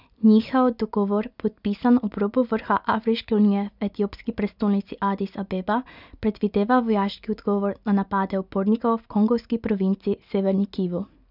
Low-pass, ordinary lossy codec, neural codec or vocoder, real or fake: 5.4 kHz; none; none; real